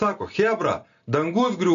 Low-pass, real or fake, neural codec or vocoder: 7.2 kHz; real; none